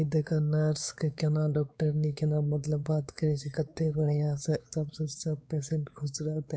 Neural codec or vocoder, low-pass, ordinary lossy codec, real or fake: codec, 16 kHz, 4 kbps, X-Codec, WavLM features, trained on Multilingual LibriSpeech; none; none; fake